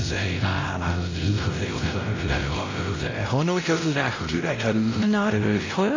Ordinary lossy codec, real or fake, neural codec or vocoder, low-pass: AAC, 32 kbps; fake; codec, 16 kHz, 0.5 kbps, X-Codec, WavLM features, trained on Multilingual LibriSpeech; 7.2 kHz